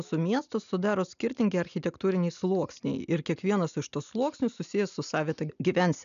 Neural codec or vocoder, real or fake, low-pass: none; real; 7.2 kHz